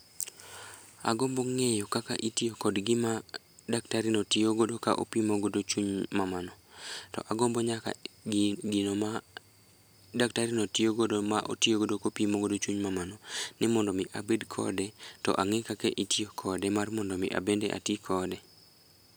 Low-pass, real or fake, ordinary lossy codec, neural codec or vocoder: none; real; none; none